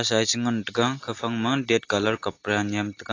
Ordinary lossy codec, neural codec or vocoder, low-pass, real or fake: AAC, 32 kbps; none; 7.2 kHz; real